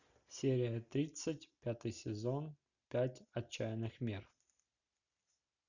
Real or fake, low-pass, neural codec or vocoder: real; 7.2 kHz; none